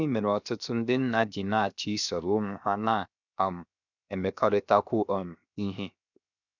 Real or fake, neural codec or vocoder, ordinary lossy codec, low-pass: fake; codec, 16 kHz, 0.7 kbps, FocalCodec; none; 7.2 kHz